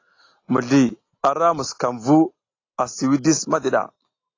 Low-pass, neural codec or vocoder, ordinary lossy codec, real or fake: 7.2 kHz; none; AAC, 32 kbps; real